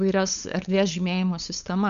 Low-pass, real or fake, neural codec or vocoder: 7.2 kHz; fake; codec, 16 kHz, 8 kbps, FunCodec, trained on LibriTTS, 25 frames a second